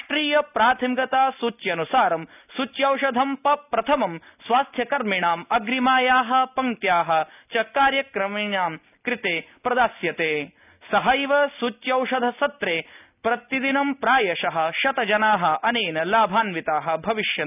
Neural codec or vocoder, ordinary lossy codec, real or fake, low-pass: none; none; real; 3.6 kHz